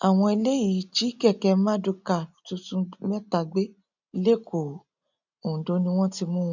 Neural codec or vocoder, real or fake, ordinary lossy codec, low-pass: none; real; none; 7.2 kHz